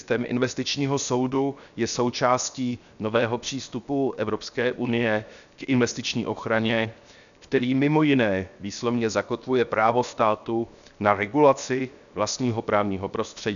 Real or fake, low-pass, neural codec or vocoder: fake; 7.2 kHz; codec, 16 kHz, 0.7 kbps, FocalCodec